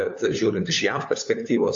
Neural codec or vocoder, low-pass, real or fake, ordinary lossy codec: codec, 16 kHz, 4 kbps, FunCodec, trained on LibriTTS, 50 frames a second; 7.2 kHz; fake; AAC, 48 kbps